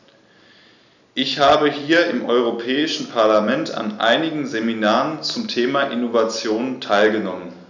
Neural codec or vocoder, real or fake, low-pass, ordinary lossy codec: none; real; 7.2 kHz; none